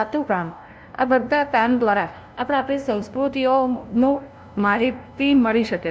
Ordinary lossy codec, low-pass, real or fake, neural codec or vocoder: none; none; fake; codec, 16 kHz, 0.5 kbps, FunCodec, trained on LibriTTS, 25 frames a second